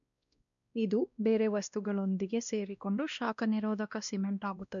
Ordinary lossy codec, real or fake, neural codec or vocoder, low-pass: none; fake; codec, 16 kHz, 1 kbps, X-Codec, WavLM features, trained on Multilingual LibriSpeech; 7.2 kHz